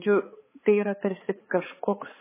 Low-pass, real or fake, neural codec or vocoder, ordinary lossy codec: 3.6 kHz; fake; codec, 16 kHz, 4 kbps, X-Codec, HuBERT features, trained on balanced general audio; MP3, 16 kbps